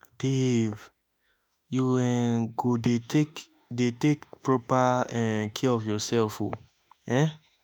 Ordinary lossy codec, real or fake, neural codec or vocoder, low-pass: none; fake; autoencoder, 48 kHz, 32 numbers a frame, DAC-VAE, trained on Japanese speech; none